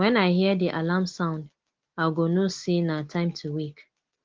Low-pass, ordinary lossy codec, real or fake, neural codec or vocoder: 7.2 kHz; Opus, 16 kbps; real; none